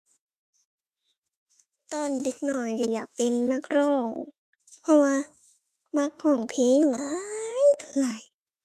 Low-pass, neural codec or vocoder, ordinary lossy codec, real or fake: 14.4 kHz; autoencoder, 48 kHz, 32 numbers a frame, DAC-VAE, trained on Japanese speech; AAC, 96 kbps; fake